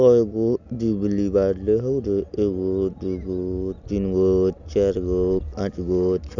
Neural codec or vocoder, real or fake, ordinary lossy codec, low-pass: none; real; none; 7.2 kHz